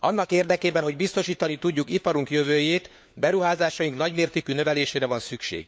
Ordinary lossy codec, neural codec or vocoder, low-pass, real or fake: none; codec, 16 kHz, 4 kbps, FunCodec, trained on LibriTTS, 50 frames a second; none; fake